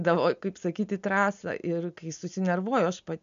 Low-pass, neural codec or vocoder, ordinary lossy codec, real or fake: 7.2 kHz; none; AAC, 96 kbps; real